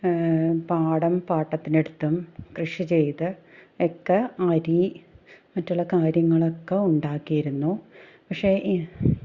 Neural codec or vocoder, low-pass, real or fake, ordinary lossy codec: none; 7.2 kHz; real; Opus, 64 kbps